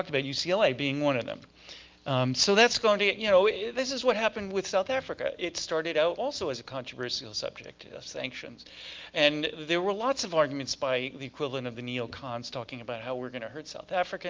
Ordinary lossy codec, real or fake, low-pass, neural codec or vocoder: Opus, 24 kbps; real; 7.2 kHz; none